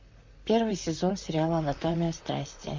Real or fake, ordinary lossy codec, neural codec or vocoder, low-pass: fake; MP3, 48 kbps; vocoder, 44.1 kHz, 128 mel bands, Pupu-Vocoder; 7.2 kHz